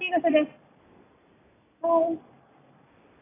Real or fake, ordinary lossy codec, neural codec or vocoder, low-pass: real; AAC, 16 kbps; none; 3.6 kHz